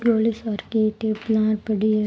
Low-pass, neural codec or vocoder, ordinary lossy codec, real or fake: none; none; none; real